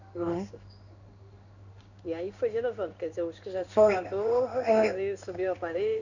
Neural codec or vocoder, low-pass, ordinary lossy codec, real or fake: codec, 16 kHz in and 24 kHz out, 1 kbps, XY-Tokenizer; 7.2 kHz; none; fake